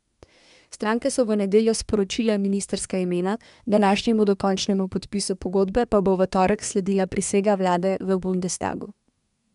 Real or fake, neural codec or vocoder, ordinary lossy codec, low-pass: fake; codec, 24 kHz, 1 kbps, SNAC; none; 10.8 kHz